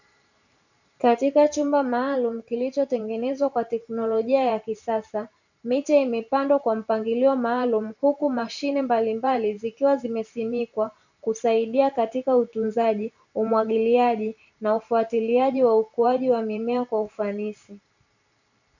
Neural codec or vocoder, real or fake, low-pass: vocoder, 44.1 kHz, 128 mel bands every 512 samples, BigVGAN v2; fake; 7.2 kHz